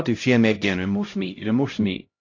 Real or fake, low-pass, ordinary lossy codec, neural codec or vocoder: fake; 7.2 kHz; AAC, 48 kbps; codec, 16 kHz, 0.5 kbps, X-Codec, HuBERT features, trained on LibriSpeech